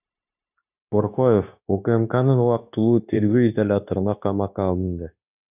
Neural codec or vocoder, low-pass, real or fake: codec, 16 kHz, 0.9 kbps, LongCat-Audio-Codec; 3.6 kHz; fake